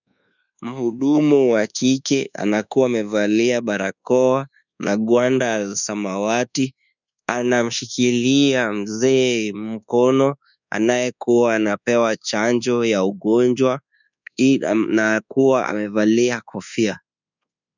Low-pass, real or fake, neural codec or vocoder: 7.2 kHz; fake; codec, 24 kHz, 1.2 kbps, DualCodec